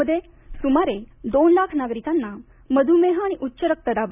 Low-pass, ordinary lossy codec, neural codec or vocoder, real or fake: 3.6 kHz; none; none; real